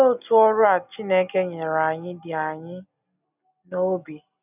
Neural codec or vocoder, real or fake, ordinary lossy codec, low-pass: none; real; none; 3.6 kHz